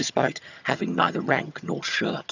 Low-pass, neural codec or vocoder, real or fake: 7.2 kHz; vocoder, 22.05 kHz, 80 mel bands, HiFi-GAN; fake